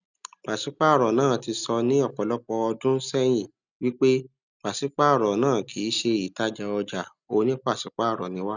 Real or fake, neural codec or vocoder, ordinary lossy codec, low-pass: real; none; none; 7.2 kHz